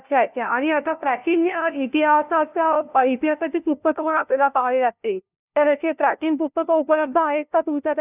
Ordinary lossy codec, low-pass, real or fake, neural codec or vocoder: none; 3.6 kHz; fake; codec, 16 kHz, 0.5 kbps, FunCodec, trained on LibriTTS, 25 frames a second